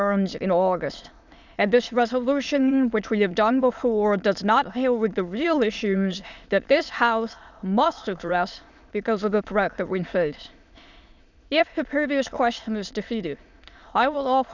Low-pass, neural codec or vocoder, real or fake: 7.2 kHz; autoencoder, 22.05 kHz, a latent of 192 numbers a frame, VITS, trained on many speakers; fake